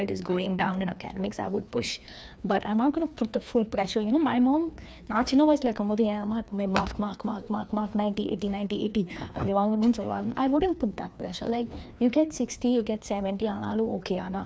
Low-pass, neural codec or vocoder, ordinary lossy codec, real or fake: none; codec, 16 kHz, 2 kbps, FreqCodec, larger model; none; fake